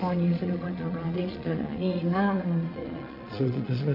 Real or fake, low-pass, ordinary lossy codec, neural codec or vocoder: fake; 5.4 kHz; none; vocoder, 22.05 kHz, 80 mel bands, Vocos